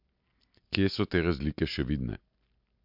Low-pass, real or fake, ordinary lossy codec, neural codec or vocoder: 5.4 kHz; real; MP3, 48 kbps; none